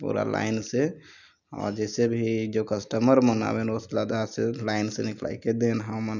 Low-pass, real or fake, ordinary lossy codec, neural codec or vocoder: 7.2 kHz; real; none; none